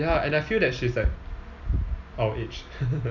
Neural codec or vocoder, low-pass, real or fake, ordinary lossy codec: none; 7.2 kHz; real; none